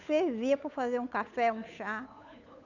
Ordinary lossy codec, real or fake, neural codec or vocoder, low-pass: none; fake; codec, 16 kHz, 8 kbps, FunCodec, trained on Chinese and English, 25 frames a second; 7.2 kHz